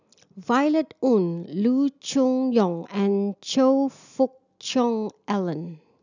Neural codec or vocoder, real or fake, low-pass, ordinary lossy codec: none; real; 7.2 kHz; none